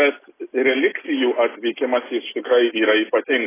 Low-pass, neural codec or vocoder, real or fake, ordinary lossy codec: 3.6 kHz; none; real; AAC, 16 kbps